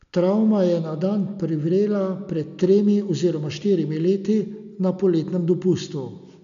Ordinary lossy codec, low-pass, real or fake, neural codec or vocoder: MP3, 96 kbps; 7.2 kHz; real; none